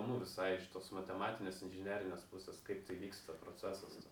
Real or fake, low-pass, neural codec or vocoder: real; 19.8 kHz; none